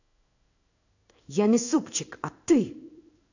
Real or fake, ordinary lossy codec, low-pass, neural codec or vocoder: fake; none; 7.2 kHz; codec, 16 kHz in and 24 kHz out, 1 kbps, XY-Tokenizer